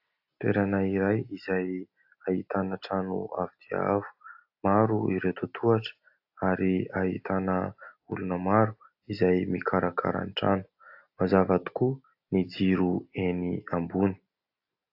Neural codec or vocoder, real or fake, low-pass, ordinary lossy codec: none; real; 5.4 kHz; AAC, 48 kbps